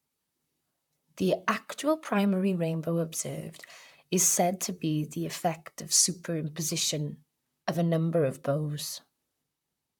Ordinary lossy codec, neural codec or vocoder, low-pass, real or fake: none; vocoder, 44.1 kHz, 128 mel bands, Pupu-Vocoder; 19.8 kHz; fake